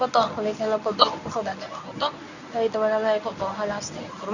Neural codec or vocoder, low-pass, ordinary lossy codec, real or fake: codec, 24 kHz, 0.9 kbps, WavTokenizer, medium speech release version 1; 7.2 kHz; none; fake